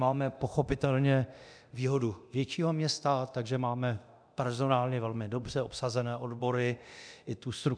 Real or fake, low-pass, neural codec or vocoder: fake; 9.9 kHz; codec, 24 kHz, 0.9 kbps, DualCodec